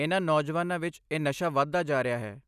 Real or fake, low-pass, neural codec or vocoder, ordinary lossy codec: real; 14.4 kHz; none; none